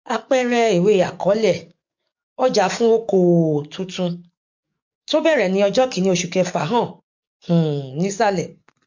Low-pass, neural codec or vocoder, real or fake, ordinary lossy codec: 7.2 kHz; codec, 44.1 kHz, 7.8 kbps, DAC; fake; MP3, 48 kbps